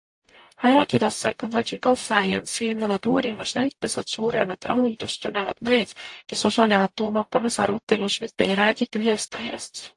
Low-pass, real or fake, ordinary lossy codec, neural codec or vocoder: 10.8 kHz; fake; AAC, 64 kbps; codec, 44.1 kHz, 0.9 kbps, DAC